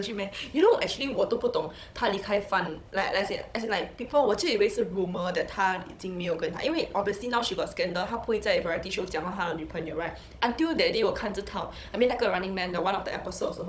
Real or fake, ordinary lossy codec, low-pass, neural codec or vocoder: fake; none; none; codec, 16 kHz, 16 kbps, FunCodec, trained on Chinese and English, 50 frames a second